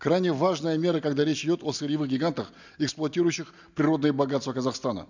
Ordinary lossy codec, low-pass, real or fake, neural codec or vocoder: none; 7.2 kHz; real; none